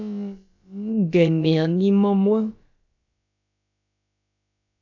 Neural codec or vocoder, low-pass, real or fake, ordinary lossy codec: codec, 16 kHz, about 1 kbps, DyCAST, with the encoder's durations; 7.2 kHz; fake; AAC, 48 kbps